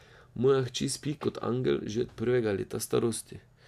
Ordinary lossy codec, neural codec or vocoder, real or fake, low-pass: AAC, 96 kbps; none; real; 14.4 kHz